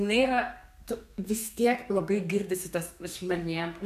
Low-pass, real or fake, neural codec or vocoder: 14.4 kHz; fake; codec, 32 kHz, 1.9 kbps, SNAC